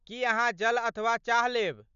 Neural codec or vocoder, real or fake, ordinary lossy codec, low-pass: none; real; none; 7.2 kHz